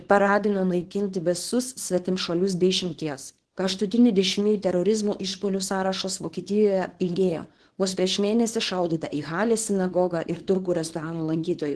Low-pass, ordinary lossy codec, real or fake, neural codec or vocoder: 10.8 kHz; Opus, 16 kbps; fake; codec, 24 kHz, 0.9 kbps, WavTokenizer, small release